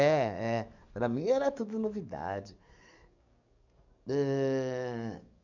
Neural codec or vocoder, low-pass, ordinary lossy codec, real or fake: codec, 44.1 kHz, 7.8 kbps, DAC; 7.2 kHz; none; fake